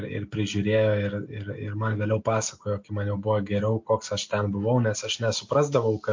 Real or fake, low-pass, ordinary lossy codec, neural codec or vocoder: real; 7.2 kHz; MP3, 48 kbps; none